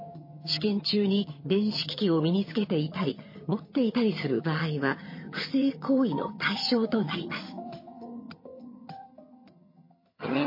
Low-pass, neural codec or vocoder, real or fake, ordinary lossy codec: 5.4 kHz; vocoder, 22.05 kHz, 80 mel bands, HiFi-GAN; fake; MP3, 24 kbps